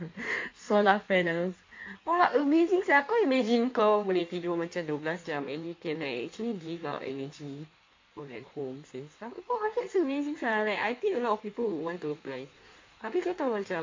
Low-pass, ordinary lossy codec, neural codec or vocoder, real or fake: 7.2 kHz; MP3, 48 kbps; codec, 16 kHz in and 24 kHz out, 1.1 kbps, FireRedTTS-2 codec; fake